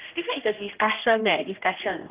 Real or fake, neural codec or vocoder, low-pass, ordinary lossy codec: fake; codec, 16 kHz, 1 kbps, X-Codec, HuBERT features, trained on general audio; 3.6 kHz; Opus, 32 kbps